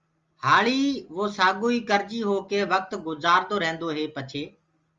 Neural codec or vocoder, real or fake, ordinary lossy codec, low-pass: none; real; Opus, 32 kbps; 7.2 kHz